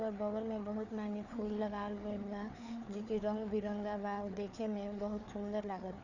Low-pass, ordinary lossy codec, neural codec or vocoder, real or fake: 7.2 kHz; none; codec, 16 kHz, 4 kbps, FreqCodec, larger model; fake